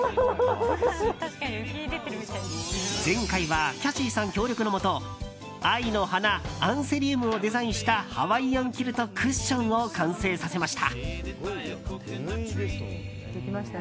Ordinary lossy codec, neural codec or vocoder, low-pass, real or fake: none; none; none; real